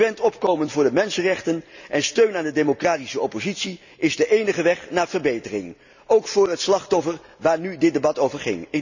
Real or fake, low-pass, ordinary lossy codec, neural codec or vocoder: real; 7.2 kHz; none; none